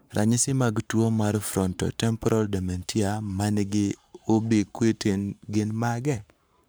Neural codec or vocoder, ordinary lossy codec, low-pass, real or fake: codec, 44.1 kHz, 7.8 kbps, Pupu-Codec; none; none; fake